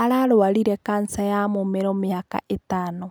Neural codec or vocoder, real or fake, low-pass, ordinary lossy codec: none; real; none; none